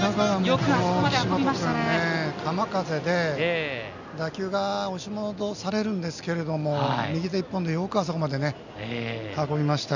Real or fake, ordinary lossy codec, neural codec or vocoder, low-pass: real; none; none; 7.2 kHz